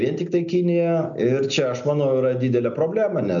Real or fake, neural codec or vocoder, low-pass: real; none; 7.2 kHz